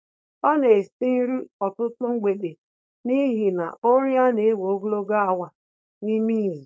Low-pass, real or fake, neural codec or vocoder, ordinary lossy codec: none; fake; codec, 16 kHz, 4.8 kbps, FACodec; none